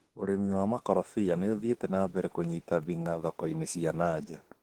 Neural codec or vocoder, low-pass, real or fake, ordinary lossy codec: autoencoder, 48 kHz, 32 numbers a frame, DAC-VAE, trained on Japanese speech; 19.8 kHz; fake; Opus, 16 kbps